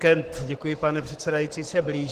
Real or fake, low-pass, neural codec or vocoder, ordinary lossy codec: fake; 14.4 kHz; codec, 44.1 kHz, 7.8 kbps, Pupu-Codec; Opus, 16 kbps